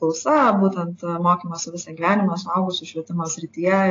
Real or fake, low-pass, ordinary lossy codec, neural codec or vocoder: real; 7.2 kHz; AAC, 32 kbps; none